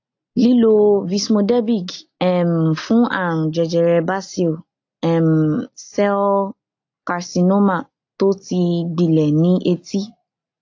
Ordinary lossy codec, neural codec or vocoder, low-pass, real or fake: AAC, 48 kbps; none; 7.2 kHz; real